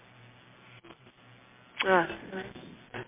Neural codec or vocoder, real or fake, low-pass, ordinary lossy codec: none; real; 3.6 kHz; MP3, 24 kbps